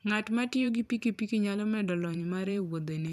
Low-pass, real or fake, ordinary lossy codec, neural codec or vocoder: 14.4 kHz; fake; none; vocoder, 44.1 kHz, 128 mel bands every 512 samples, BigVGAN v2